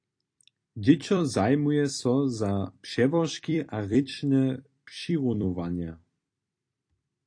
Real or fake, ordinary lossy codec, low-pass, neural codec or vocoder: fake; AAC, 48 kbps; 9.9 kHz; vocoder, 44.1 kHz, 128 mel bands every 256 samples, BigVGAN v2